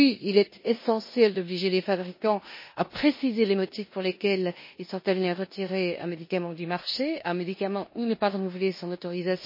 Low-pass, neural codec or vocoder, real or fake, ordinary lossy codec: 5.4 kHz; codec, 16 kHz in and 24 kHz out, 0.9 kbps, LongCat-Audio-Codec, four codebook decoder; fake; MP3, 24 kbps